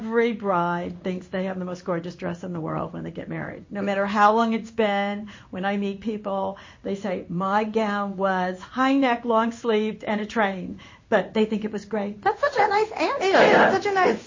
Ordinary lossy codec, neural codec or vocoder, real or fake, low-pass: MP3, 32 kbps; codec, 16 kHz in and 24 kHz out, 1 kbps, XY-Tokenizer; fake; 7.2 kHz